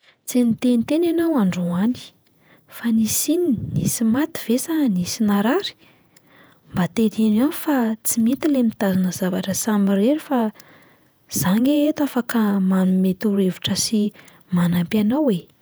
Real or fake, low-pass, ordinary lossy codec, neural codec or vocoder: fake; none; none; vocoder, 48 kHz, 128 mel bands, Vocos